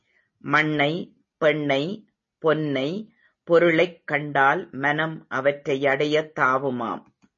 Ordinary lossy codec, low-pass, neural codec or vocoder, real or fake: MP3, 32 kbps; 7.2 kHz; none; real